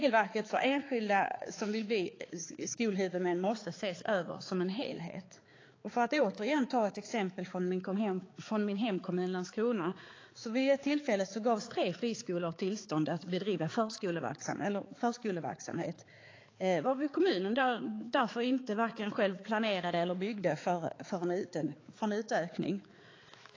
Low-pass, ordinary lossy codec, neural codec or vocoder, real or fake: 7.2 kHz; AAC, 32 kbps; codec, 16 kHz, 4 kbps, X-Codec, HuBERT features, trained on balanced general audio; fake